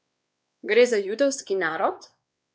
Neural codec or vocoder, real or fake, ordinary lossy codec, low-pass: codec, 16 kHz, 2 kbps, X-Codec, WavLM features, trained on Multilingual LibriSpeech; fake; none; none